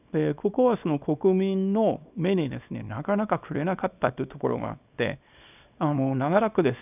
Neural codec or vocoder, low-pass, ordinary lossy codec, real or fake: codec, 24 kHz, 0.9 kbps, WavTokenizer, small release; 3.6 kHz; none; fake